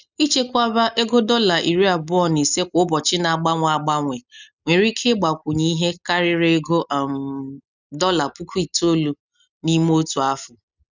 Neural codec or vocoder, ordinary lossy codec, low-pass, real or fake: none; none; 7.2 kHz; real